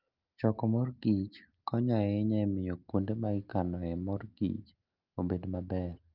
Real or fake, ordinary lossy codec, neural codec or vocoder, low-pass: real; Opus, 32 kbps; none; 5.4 kHz